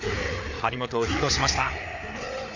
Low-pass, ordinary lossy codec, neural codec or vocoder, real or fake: 7.2 kHz; MP3, 48 kbps; codec, 16 kHz, 4 kbps, FreqCodec, larger model; fake